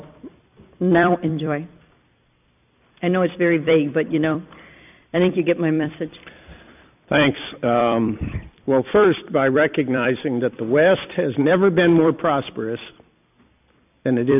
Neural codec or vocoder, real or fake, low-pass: vocoder, 44.1 kHz, 128 mel bands every 256 samples, BigVGAN v2; fake; 3.6 kHz